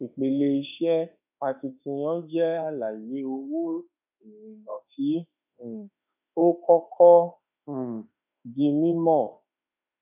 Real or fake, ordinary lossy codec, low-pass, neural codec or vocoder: fake; none; 3.6 kHz; autoencoder, 48 kHz, 32 numbers a frame, DAC-VAE, trained on Japanese speech